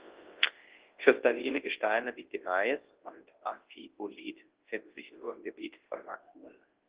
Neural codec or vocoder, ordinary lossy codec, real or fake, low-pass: codec, 24 kHz, 0.9 kbps, WavTokenizer, large speech release; Opus, 16 kbps; fake; 3.6 kHz